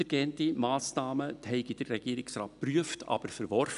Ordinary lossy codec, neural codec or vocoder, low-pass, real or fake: none; none; 10.8 kHz; real